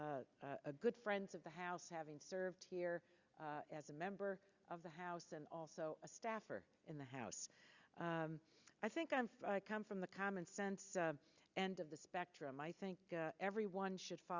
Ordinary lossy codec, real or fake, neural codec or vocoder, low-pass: Opus, 64 kbps; real; none; 7.2 kHz